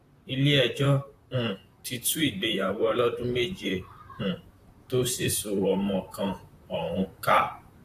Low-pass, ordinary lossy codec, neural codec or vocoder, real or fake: 14.4 kHz; AAC, 64 kbps; vocoder, 44.1 kHz, 128 mel bands, Pupu-Vocoder; fake